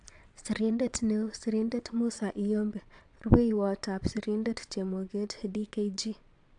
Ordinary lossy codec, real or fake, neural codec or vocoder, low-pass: AAC, 64 kbps; fake; vocoder, 22.05 kHz, 80 mel bands, Vocos; 9.9 kHz